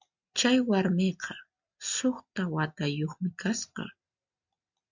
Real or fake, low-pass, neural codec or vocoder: real; 7.2 kHz; none